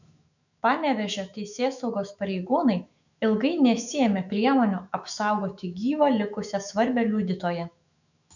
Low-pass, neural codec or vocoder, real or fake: 7.2 kHz; autoencoder, 48 kHz, 128 numbers a frame, DAC-VAE, trained on Japanese speech; fake